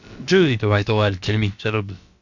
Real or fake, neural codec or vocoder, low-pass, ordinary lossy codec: fake; codec, 16 kHz, about 1 kbps, DyCAST, with the encoder's durations; 7.2 kHz; none